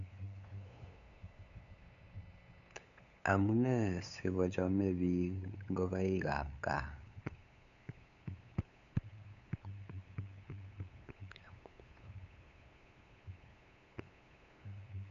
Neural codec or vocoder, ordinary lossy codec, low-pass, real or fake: codec, 16 kHz, 8 kbps, FunCodec, trained on LibriTTS, 25 frames a second; none; 7.2 kHz; fake